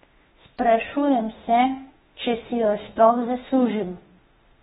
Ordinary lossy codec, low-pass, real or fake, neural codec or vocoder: AAC, 16 kbps; 19.8 kHz; fake; autoencoder, 48 kHz, 32 numbers a frame, DAC-VAE, trained on Japanese speech